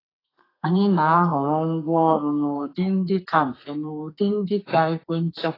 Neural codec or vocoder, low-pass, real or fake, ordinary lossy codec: codec, 32 kHz, 1.9 kbps, SNAC; 5.4 kHz; fake; AAC, 24 kbps